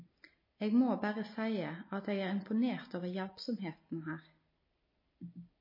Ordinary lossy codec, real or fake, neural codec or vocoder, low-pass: MP3, 24 kbps; real; none; 5.4 kHz